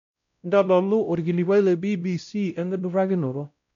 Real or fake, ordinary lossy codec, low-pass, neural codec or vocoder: fake; none; 7.2 kHz; codec, 16 kHz, 0.5 kbps, X-Codec, WavLM features, trained on Multilingual LibriSpeech